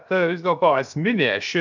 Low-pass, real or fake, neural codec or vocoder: 7.2 kHz; fake; codec, 16 kHz, 0.7 kbps, FocalCodec